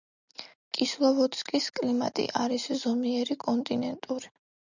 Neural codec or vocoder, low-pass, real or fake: none; 7.2 kHz; real